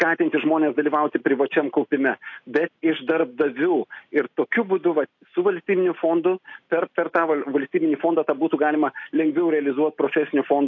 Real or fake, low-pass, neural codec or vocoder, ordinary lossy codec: real; 7.2 kHz; none; AAC, 48 kbps